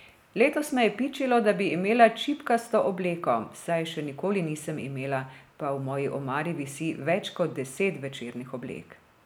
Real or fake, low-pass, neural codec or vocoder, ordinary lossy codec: real; none; none; none